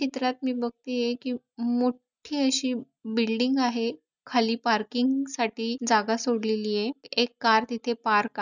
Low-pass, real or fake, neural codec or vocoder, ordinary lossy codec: 7.2 kHz; real; none; none